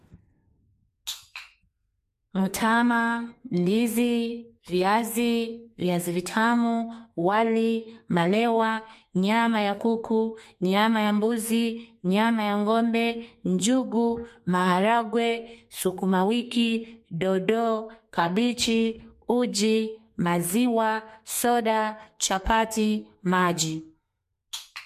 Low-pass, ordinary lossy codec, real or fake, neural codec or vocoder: 14.4 kHz; MP3, 64 kbps; fake; codec, 32 kHz, 1.9 kbps, SNAC